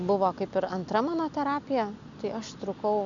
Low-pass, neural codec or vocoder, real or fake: 7.2 kHz; none; real